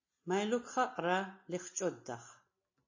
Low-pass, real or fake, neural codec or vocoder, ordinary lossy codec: 7.2 kHz; real; none; MP3, 32 kbps